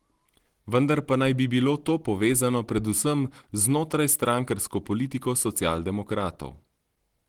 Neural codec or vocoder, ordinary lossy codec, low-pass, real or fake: none; Opus, 16 kbps; 19.8 kHz; real